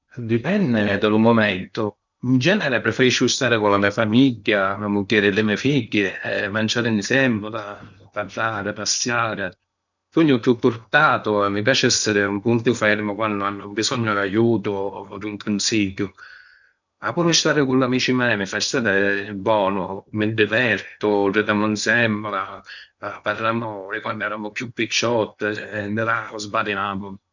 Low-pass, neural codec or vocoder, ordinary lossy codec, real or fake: 7.2 kHz; codec, 16 kHz in and 24 kHz out, 0.8 kbps, FocalCodec, streaming, 65536 codes; none; fake